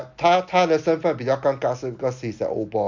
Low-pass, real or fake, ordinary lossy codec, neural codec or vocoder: 7.2 kHz; real; MP3, 64 kbps; none